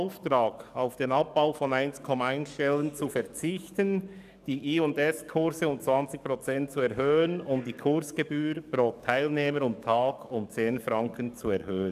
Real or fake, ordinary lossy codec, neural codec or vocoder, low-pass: fake; none; codec, 44.1 kHz, 7.8 kbps, DAC; 14.4 kHz